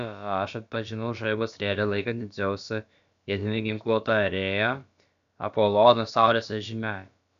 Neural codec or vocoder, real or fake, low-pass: codec, 16 kHz, about 1 kbps, DyCAST, with the encoder's durations; fake; 7.2 kHz